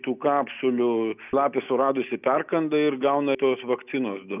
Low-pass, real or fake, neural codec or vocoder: 3.6 kHz; real; none